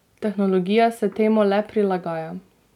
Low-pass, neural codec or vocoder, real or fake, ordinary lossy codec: 19.8 kHz; none; real; none